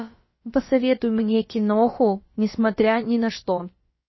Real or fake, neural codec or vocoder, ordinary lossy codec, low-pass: fake; codec, 16 kHz, about 1 kbps, DyCAST, with the encoder's durations; MP3, 24 kbps; 7.2 kHz